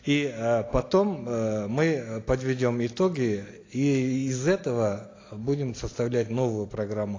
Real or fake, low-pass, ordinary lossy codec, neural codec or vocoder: real; 7.2 kHz; AAC, 32 kbps; none